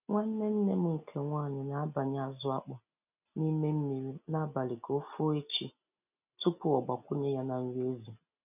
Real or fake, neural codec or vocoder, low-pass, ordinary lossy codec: real; none; 3.6 kHz; none